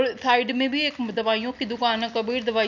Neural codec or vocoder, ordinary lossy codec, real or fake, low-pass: none; none; real; 7.2 kHz